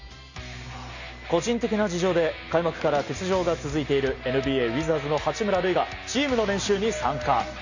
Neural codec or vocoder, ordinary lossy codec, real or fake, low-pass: none; none; real; 7.2 kHz